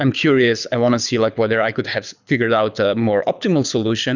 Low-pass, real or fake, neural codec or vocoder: 7.2 kHz; fake; codec, 24 kHz, 6 kbps, HILCodec